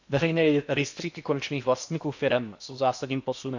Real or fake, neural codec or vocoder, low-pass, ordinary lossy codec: fake; codec, 16 kHz in and 24 kHz out, 0.8 kbps, FocalCodec, streaming, 65536 codes; 7.2 kHz; none